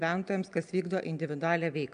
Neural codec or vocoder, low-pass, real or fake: none; 9.9 kHz; real